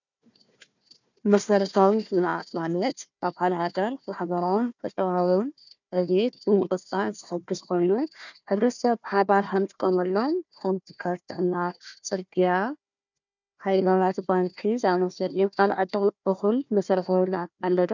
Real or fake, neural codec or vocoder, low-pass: fake; codec, 16 kHz, 1 kbps, FunCodec, trained on Chinese and English, 50 frames a second; 7.2 kHz